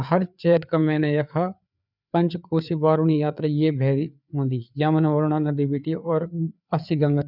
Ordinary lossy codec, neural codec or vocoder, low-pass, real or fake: none; codec, 16 kHz, 4 kbps, FreqCodec, larger model; 5.4 kHz; fake